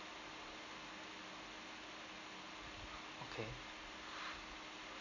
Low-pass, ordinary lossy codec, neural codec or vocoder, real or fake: 7.2 kHz; none; none; real